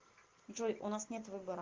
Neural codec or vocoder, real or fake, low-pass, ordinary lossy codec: none; real; 7.2 kHz; Opus, 16 kbps